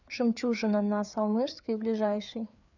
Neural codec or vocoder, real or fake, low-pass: codec, 16 kHz, 4 kbps, FreqCodec, larger model; fake; 7.2 kHz